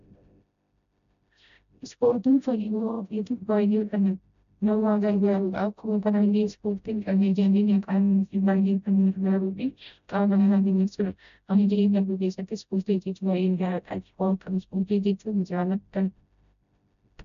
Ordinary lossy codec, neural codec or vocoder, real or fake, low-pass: AAC, 96 kbps; codec, 16 kHz, 0.5 kbps, FreqCodec, smaller model; fake; 7.2 kHz